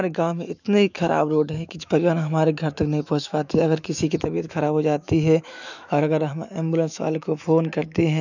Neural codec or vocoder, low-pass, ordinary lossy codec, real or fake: autoencoder, 48 kHz, 128 numbers a frame, DAC-VAE, trained on Japanese speech; 7.2 kHz; none; fake